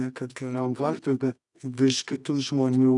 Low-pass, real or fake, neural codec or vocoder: 10.8 kHz; fake; codec, 24 kHz, 0.9 kbps, WavTokenizer, medium music audio release